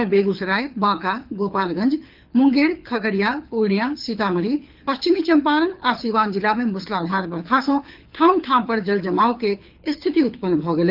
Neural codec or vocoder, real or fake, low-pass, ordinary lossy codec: codec, 24 kHz, 6 kbps, HILCodec; fake; 5.4 kHz; Opus, 32 kbps